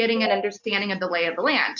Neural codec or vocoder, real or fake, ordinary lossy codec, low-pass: none; real; Opus, 64 kbps; 7.2 kHz